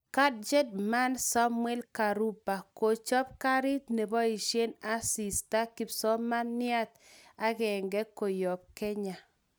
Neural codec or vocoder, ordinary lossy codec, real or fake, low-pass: none; none; real; none